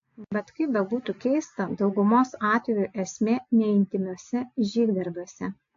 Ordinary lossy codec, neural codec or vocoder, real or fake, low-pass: AAC, 48 kbps; none; real; 7.2 kHz